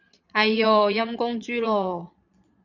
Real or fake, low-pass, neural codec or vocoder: fake; 7.2 kHz; vocoder, 22.05 kHz, 80 mel bands, Vocos